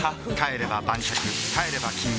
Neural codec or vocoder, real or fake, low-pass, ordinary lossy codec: none; real; none; none